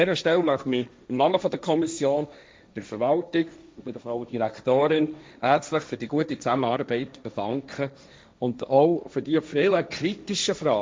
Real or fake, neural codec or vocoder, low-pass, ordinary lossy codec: fake; codec, 16 kHz, 1.1 kbps, Voila-Tokenizer; none; none